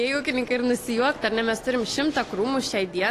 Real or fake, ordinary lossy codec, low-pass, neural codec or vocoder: real; AAC, 48 kbps; 14.4 kHz; none